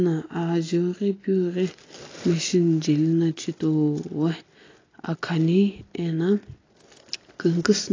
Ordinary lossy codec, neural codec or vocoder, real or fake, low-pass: AAC, 32 kbps; none; real; 7.2 kHz